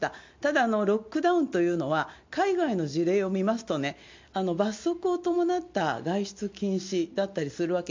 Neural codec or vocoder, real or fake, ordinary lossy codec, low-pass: none; real; MP3, 48 kbps; 7.2 kHz